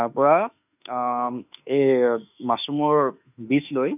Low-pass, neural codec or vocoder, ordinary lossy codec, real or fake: 3.6 kHz; autoencoder, 48 kHz, 32 numbers a frame, DAC-VAE, trained on Japanese speech; none; fake